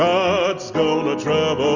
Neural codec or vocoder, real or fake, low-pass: none; real; 7.2 kHz